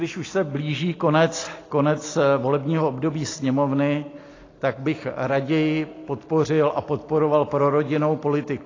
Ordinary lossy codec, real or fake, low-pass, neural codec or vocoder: AAC, 32 kbps; real; 7.2 kHz; none